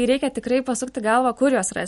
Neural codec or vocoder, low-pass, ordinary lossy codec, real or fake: none; 19.8 kHz; MP3, 48 kbps; real